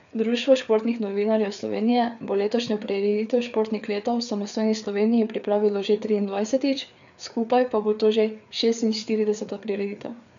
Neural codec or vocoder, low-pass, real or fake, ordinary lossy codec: codec, 16 kHz, 4 kbps, FreqCodec, larger model; 7.2 kHz; fake; none